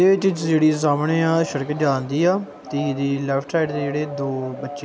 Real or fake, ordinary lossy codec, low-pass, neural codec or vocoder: real; none; none; none